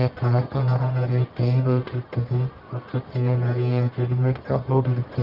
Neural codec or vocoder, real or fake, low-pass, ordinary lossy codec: codec, 44.1 kHz, 1.7 kbps, Pupu-Codec; fake; 5.4 kHz; Opus, 32 kbps